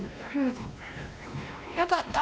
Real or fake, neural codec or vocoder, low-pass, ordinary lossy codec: fake; codec, 16 kHz, 1 kbps, X-Codec, WavLM features, trained on Multilingual LibriSpeech; none; none